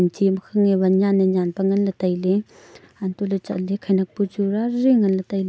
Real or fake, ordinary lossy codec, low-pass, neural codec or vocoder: real; none; none; none